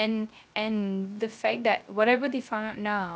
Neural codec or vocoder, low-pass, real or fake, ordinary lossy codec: codec, 16 kHz, 0.3 kbps, FocalCodec; none; fake; none